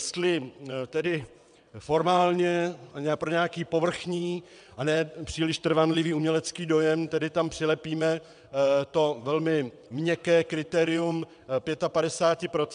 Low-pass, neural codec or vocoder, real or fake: 9.9 kHz; vocoder, 22.05 kHz, 80 mel bands, WaveNeXt; fake